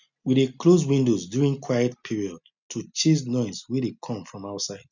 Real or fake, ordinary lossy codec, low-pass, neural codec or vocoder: real; none; 7.2 kHz; none